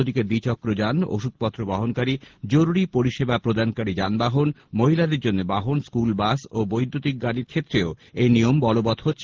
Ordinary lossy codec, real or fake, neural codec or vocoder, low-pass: Opus, 16 kbps; real; none; 7.2 kHz